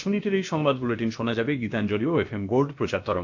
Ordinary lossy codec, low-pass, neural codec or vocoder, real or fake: AAC, 48 kbps; 7.2 kHz; codec, 16 kHz, about 1 kbps, DyCAST, with the encoder's durations; fake